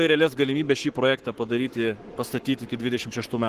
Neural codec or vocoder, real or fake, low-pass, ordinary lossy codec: codec, 44.1 kHz, 7.8 kbps, Pupu-Codec; fake; 14.4 kHz; Opus, 16 kbps